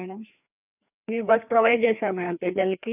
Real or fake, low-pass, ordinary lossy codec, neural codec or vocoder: fake; 3.6 kHz; none; codec, 16 kHz, 2 kbps, FreqCodec, larger model